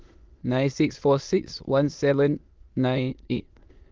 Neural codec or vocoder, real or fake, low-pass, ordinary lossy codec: autoencoder, 22.05 kHz, a latent of 192 numbers a frame, VITS, trained on many speakers; fake; 7.2 kHz; Opus, 32 kbps